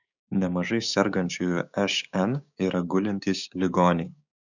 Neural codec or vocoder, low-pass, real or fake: codec, 16 kHz, 6 kbps, DAC; 7.2 kHz; fake